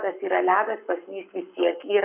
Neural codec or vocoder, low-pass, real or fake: codec, 44.1 kHz, 7.8 kbps, Pupu-Codec; 3.6 kHz; fake